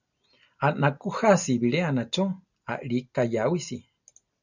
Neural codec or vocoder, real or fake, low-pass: none; real; 7.2 kHz